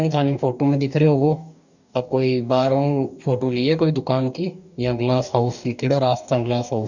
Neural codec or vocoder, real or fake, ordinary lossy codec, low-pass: codec, 44.1 kHz, 2.6 kbps, DAC; fake; none; 7.2 kHz